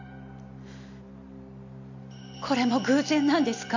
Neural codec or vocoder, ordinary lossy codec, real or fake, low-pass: none; none; real; 7.2 kHz